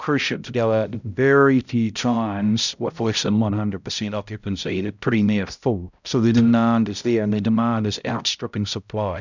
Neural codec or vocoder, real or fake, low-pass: codec, 16 kHz, 0.5 kbps, X-Codec, HuBERT features, trained on balanced general audio; fake; 7.2 kHz